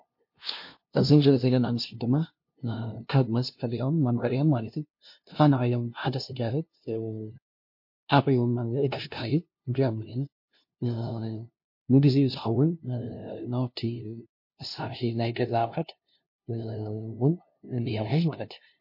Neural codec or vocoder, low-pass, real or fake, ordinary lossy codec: codec, 16 kHz, 0.5 kbps, FunCodec, trained on LibriTTS, 25 frames a second; 5.4 kHz; fake; MP3, 32 kbps